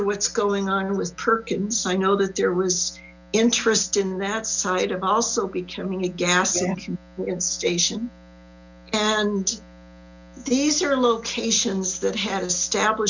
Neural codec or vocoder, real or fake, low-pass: none; real; 7.2 kHz